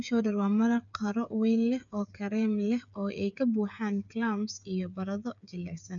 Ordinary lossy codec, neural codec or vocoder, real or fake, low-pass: none; codec, 16 kHz, 8 kbps, FreqCodec, smaller model; fake; 7.2 kHz